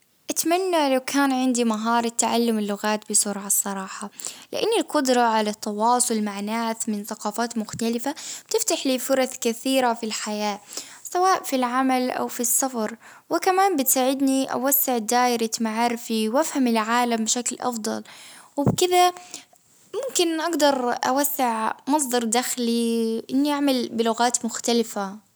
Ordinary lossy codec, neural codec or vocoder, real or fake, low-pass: none; none; real; none